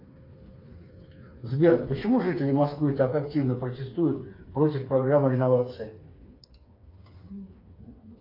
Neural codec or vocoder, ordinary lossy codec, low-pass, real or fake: codec, 16 kHz, 4 kbps, FreqCodec, smaller model; AAC, 32 kbps; 5.4 kHz; fake